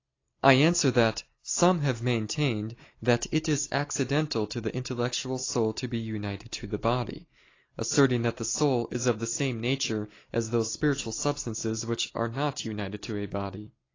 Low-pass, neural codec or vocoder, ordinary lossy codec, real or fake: 7.2 kHz; none; AAC, 32 kbps; real